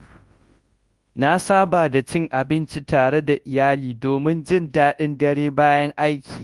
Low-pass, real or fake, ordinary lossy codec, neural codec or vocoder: 10.8 kHz; fake; Opus, 24 kbps; codec, 24 kHz, 0.9 kbps, WavTokenizer, large speech release